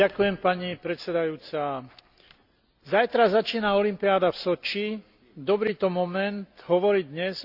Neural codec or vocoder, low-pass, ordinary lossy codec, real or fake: none; 5.4 kHz; Opus, 64 kbps; real